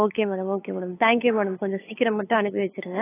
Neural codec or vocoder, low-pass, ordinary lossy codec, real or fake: codec, 16 kHz, 8 kbps, FunCodec, trained on LibriTTS, 25 frames a second; 3.6 kHz; AAC, 16 kbps; fake